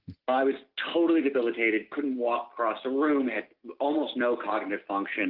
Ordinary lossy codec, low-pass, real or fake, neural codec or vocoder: Opus, 24 kbps; 5.4 kHz; real; none